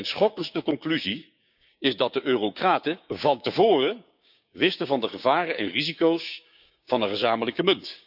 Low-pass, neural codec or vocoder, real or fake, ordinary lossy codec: 5.4 kHz; codec, 16 kHz, 6 kbps, DAC; fake; none